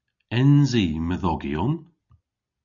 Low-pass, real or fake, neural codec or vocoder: 7.2 kHz; real; none